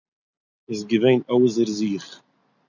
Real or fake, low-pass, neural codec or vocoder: real; 7.2 kHz; none